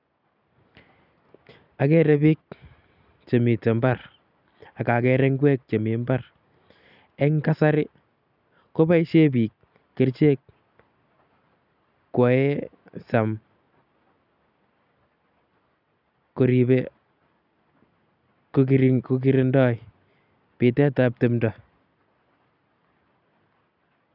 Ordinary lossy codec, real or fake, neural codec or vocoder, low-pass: none; real; none; 5.4 kHz